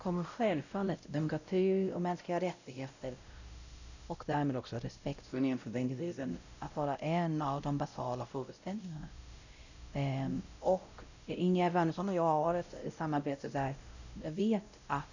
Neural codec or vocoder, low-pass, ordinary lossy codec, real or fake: codec, 16 kHz, 0.5 kbps, X-Codec, WavLM features, trained on Multilingual LibriSpeech; 7.2 kHz; none; fake